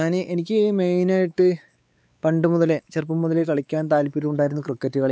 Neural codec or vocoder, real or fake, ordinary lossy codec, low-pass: codec, 16 kHz, 4 kbps, X-Codec, WavLM features, trained on Multilingual LibriSpeech; fake; none; none